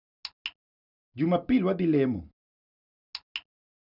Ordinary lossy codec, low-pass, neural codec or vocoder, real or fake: none; 5.4 kHz; none; real